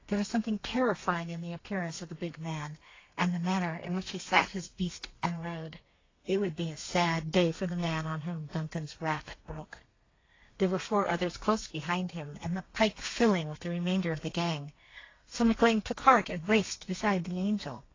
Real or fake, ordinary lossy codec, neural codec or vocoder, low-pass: fake; AAC, 32 kbps; codec, 32 kHz, 1.9 kbps, SNAC; 7.2 kHz